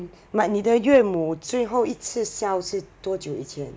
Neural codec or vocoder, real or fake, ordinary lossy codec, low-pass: none; real; none; none